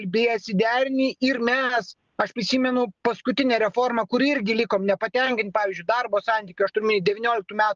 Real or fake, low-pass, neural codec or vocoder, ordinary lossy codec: real; 7.2 kHz; none; Opus, 24 kbps